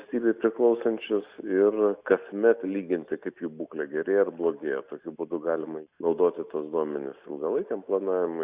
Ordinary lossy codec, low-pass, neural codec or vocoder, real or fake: Opus, 64 kbps; 3.6 kHz; none; real